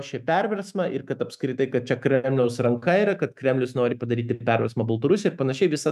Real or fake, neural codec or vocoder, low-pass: fake; autoencoder, 48 kHz, 128 numbers a frame, DAC-VAE, trained on Japanese speech; 14.4 kHz